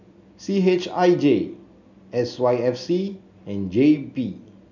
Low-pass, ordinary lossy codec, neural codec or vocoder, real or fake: 7.2 kHz; none; none; real